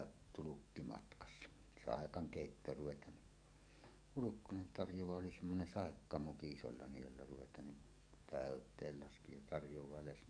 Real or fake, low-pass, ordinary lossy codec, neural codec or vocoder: fake; 9.9 kHz; none; codec, 44.1 kHz, 7.8 kbps, DAC